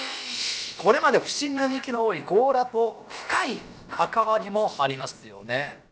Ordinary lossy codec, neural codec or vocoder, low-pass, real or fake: none; codec, 16 kHz, about 1 kbps, DyCAST, with the encoder's durations; none; fake